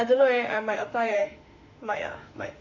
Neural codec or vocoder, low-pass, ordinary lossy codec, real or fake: autoencoder, 48 kHz, 32 numbers a frame, DAC-VAE, trained on Japanese speech; 7.2 kHz; MP3, 64 kbps; fake